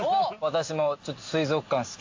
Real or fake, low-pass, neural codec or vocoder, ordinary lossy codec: real; 7.2 kHz; none; AAC, 48 kbps